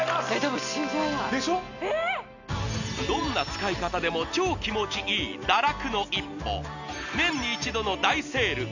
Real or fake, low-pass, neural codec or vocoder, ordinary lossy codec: real; 7.2 kHz; none; none